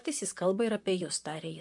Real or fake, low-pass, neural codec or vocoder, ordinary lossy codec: fake; 10.8 kHz; vocoder, 44.1 kHz, 128 mel bands, Pupu-Vocoder; MP3, 64 kbps